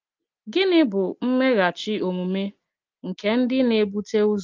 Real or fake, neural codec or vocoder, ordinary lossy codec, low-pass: real; none; Opus, 32 kbps; 7.2 kHz